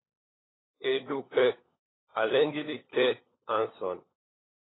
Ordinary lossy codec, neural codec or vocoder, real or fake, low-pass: AAC, 16 kbps; codec, 16 kHz, 16 kbps, FunCodec, trained on LibriTTS, 50 frames a second; fake; 7.2 kHz